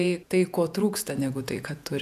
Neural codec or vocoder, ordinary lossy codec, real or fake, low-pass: vocoder, 48 kHz, 128 mel bands, Vocos; MP3, 96 kbps; fake; 14.4 kHz